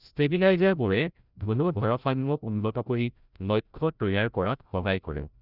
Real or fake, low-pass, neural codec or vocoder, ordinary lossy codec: fake; 5.4 kHz; codec, 16 kHz, 0.5 kbps, FreqCodec, larger model; none